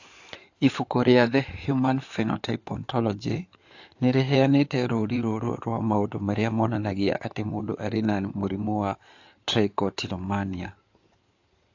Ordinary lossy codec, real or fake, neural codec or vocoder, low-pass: AAC, 48 kbps; fake; codec, 16 kHz in and 24 kHz out, 2.2 kbps, FireRedTTS-2 codec; 7.2 kHz